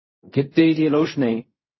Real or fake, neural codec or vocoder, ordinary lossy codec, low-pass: fake; codec, 16 kHz in and 24 kHz out, 0.4 kbps, LongCat-Audio-Codec, fine tuned four codebook decoder; MP3, 24 kbps; 7.2 kHz